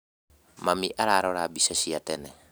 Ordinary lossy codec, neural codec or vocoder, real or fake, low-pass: none; none; real; none